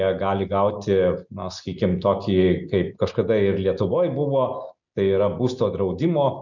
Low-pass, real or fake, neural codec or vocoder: 7.2 kHz; real; none